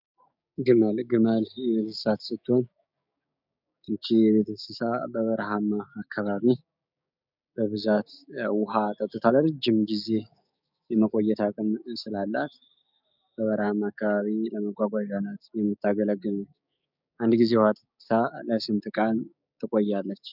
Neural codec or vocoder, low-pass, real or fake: codec, 16 kHz, 6 kbps, DAC; 5.4 kHz; fake